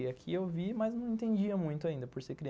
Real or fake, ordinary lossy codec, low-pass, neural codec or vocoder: real; none; none; none